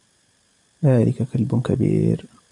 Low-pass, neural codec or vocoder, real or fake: 10.8 kHz; vocoder, 44.1 kHz, 128 mel bands every 512 samples, BigVGAN v2; fake